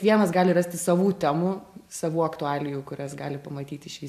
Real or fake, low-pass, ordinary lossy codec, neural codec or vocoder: real; 14.4 kHz; AAC, 96 kbps; none